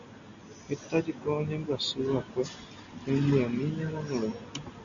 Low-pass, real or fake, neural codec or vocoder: 7.2 kHz; real; none